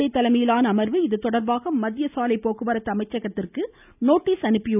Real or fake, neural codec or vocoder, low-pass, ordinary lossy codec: real; none; 3.6 kHz; none